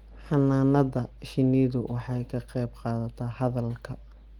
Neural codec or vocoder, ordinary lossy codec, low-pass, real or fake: none; Opus, 24 kbps; 19.8 kHz; real